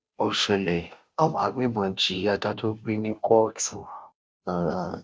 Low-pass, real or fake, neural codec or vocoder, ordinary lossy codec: none; fake; codec, 16 kHz, 0.5 kbps, FunCodec, trained on Chinese and English, 25 frames a second; none